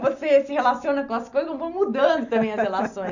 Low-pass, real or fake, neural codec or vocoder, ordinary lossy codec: 7.2 kHz; real; none; none